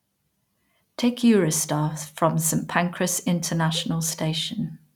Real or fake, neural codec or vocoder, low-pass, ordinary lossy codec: real; none; 19.8 kHz; none